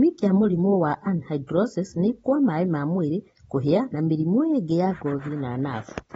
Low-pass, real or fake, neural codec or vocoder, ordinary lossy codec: 19.8 kHz; real; none; AAC, 24 kbps